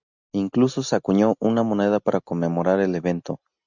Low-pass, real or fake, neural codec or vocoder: 7.2 kHz; real; none